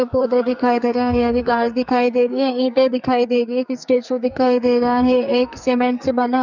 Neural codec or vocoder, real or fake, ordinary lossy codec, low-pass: codec, 44.1 kHz, 2.6 kbps, SNAC; fake; none; 7.2 kHz